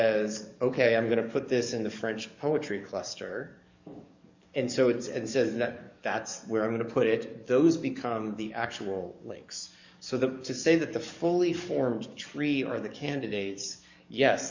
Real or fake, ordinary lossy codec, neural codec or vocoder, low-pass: fake; MP3, 64 kbps; codec, 44.1 kHz, 7.8 kbps, DAC; 7.2 kHz